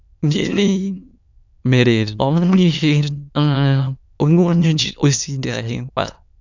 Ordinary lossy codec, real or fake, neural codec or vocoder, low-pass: none; fake; autoencoder, 22.05 kHz, a latent of 192 numbers a frame, VITS, trained on many speakers; 7.2 kHz